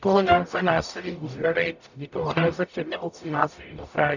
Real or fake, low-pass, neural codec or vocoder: fake; 7.2 kHz; codec, 44.1 kHz, 0.9 kbps, DAC